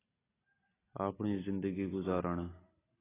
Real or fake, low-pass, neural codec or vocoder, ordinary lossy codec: real; 3.6 kHz; none; AAC, 16 kbps